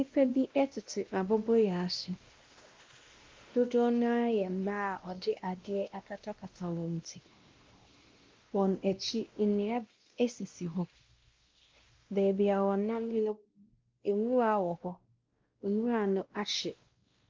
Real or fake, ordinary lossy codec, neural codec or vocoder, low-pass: fake; Opus, 32 kbps; codec, 16 kHz, 1 kbps, X-Codec, WavLM features, trained on Multilingual LibriSpeech; 7.2 kHz